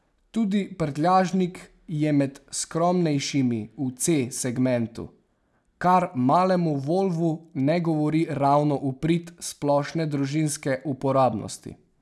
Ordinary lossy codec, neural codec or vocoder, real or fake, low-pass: none; none; real; none